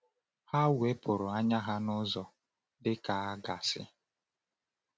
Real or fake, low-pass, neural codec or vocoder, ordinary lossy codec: real; none; none; none